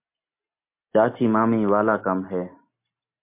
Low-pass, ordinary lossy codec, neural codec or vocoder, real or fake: 3.6 kHz; MP3, 24 kbps; none; real